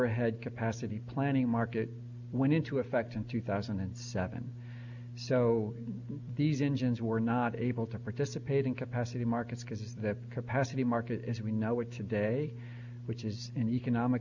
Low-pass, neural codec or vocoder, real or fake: 7.2 kHz; none; real